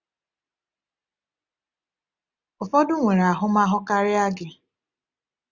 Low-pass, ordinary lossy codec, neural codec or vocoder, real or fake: 7.2 kHz; Opus, 64 kbps; none; real